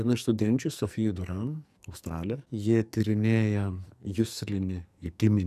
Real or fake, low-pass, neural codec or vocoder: fake; 14.4 kHz; codec, 44.1 kHz, 2.6 kbps, SNAC